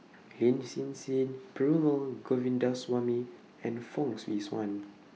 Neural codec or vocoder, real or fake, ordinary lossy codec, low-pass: none; real; none; none